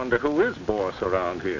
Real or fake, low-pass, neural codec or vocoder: real; 7.2 kHz; none